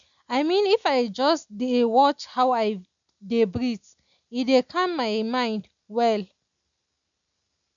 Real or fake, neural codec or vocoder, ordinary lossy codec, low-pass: real; none; none; 7.2 kHz